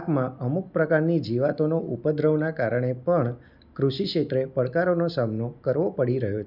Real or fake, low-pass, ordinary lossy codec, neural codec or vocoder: real; 5.4 kHz; none; none